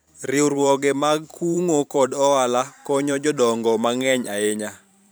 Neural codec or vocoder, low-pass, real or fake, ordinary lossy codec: vocoder, 44.1 kHz, 128 mel bands every 512 samples, BigVGAN v2; none; fake; none